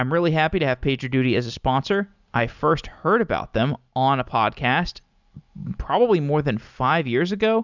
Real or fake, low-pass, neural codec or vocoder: real; 7.2 kHz; none